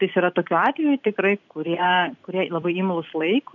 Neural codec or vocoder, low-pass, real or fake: vocoder, 44.1 kHz, 128 mel bands every 256 samples, BigVGAN v2; 7.2 kHz; fake